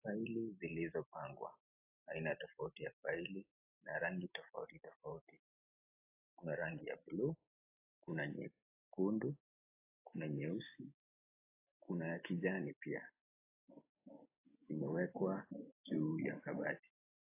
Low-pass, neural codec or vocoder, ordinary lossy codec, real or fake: 3.6 kHz; none; AAC, 32 kbps; real